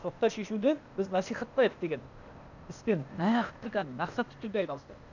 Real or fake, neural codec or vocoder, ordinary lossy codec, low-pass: fake; codec, 16 kHz, 0.8 kbps, ZipCodec; none; 7.2 kHz